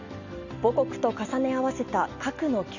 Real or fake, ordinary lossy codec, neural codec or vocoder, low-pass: real; Opus, 64 kbps; none; 7.2 kHz